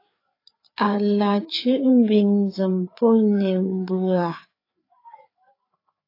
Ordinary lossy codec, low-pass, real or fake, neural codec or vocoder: AAC, 32 kbps; 5.4 kHz; fake; codec, 16 kHz, 4 kbps, FreqCodec, larger model